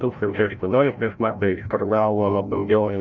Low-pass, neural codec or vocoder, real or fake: 7.2 kHz; codec, 16 kHz, 0.5 kbps, FreqCodec, larger model; fake